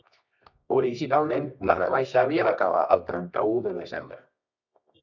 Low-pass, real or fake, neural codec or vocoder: 7.2 kHz; fake; codec, 24 kHz, 0.9 kbps, WavTokenizer, medium music audio release